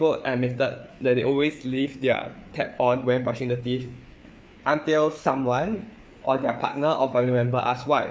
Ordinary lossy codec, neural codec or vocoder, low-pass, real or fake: none; codec, 16 kHz, 4 kbps, FreqCodec, larger model; none; fake